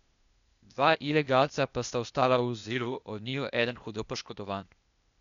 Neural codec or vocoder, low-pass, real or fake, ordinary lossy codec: codec, 16 kHz, 0.8 kbps, ZipCodec; 7.2 kHz; fake; MP3, 96 kbps